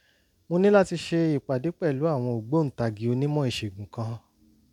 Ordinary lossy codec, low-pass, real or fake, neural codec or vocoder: none; 19.8 kHz; real; none